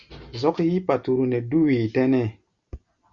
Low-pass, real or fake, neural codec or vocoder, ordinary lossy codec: 7.2 kHz; real; none; Opus, 64 kbps